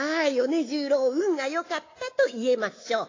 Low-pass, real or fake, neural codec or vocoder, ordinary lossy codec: 7.2 kHz; fake; codec, 24 kHz, 3.1 kbps, DualCodec; AAC, 32 kbps